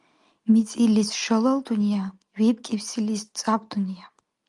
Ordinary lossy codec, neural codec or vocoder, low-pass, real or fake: Opus, 32 kbps; none; 10.8 kHz; real